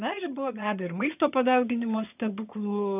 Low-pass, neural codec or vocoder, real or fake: 3.6 kHz; vocoder, 22.05 kHz, 80 mel bands, HiFi-GAN; fake